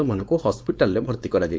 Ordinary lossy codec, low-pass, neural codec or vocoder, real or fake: none; none; codec, 16 kHz, 4.8 kbps, FACodec; fake